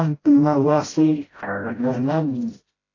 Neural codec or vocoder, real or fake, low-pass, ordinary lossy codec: codec, 16 kHz, 0.5 kbps, FreqCodec, smaller model; fake; 7.2 kHz; AAC, 32 kbps